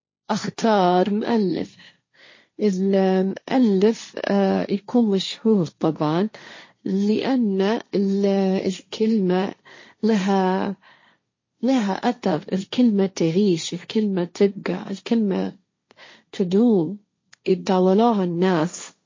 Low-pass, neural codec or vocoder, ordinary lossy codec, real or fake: 7.2 kHz; codec, 16 kHz, 1.1 kbps, Voila-Tokenizer; MP3, 32 kbps; fake